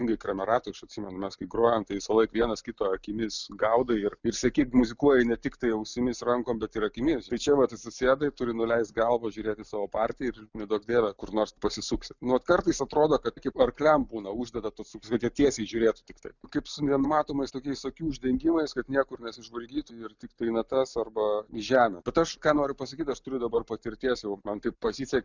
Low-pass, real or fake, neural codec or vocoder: 7.2 kHz; real; none